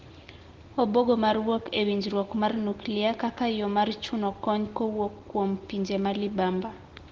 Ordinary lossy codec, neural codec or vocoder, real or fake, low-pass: Opus, 16 kbps; none; real; 7.2 kHz